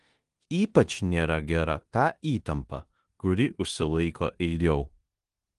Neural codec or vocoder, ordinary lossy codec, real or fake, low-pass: codec, 16 kHz in and 24 kHz out, 0.9 kbps, LongCat-Audio-Codec, four codebook decoder; Opus, 24 kbps; fake; 10.8 kHz